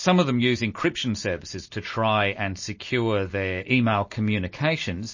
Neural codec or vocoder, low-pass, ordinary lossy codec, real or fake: none; 7.2 kHz; MP3, 32 kbps; real